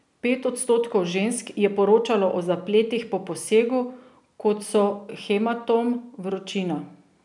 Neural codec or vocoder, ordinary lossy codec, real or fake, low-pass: none; none; real; 10.8 kHz